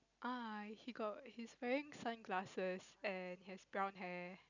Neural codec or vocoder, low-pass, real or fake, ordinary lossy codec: none; 7.2 kHz; real; none